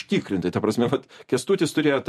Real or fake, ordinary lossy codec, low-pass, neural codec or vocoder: fake; MP3, 64 kbps; 14.4 kHz; vocoder, 44.1 kHz, 128 mel bands every 256 samples, BigVGAN v2